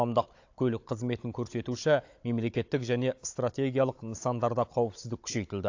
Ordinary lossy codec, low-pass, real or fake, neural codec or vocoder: AAC, 48 kbps; 7.2 kHz; fake; codec, 16 kHz, 16 kbps, FunCodec, trained on Chinese and English, 50 frames a second